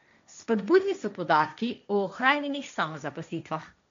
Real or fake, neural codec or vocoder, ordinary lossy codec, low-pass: fake; codec, 16 kHz, 1.1 kbps, Voila-Tokenizer; MP3, 64 kbps; 7.2 kHz